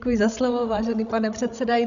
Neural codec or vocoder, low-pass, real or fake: codec, 16 kHz, 16 kbps, FreqCodec, larger model; 7.2 kHz; fake